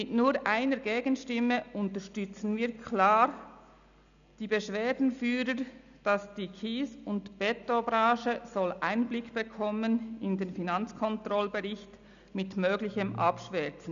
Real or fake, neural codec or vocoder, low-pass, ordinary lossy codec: real; none; 7.2 kHz; none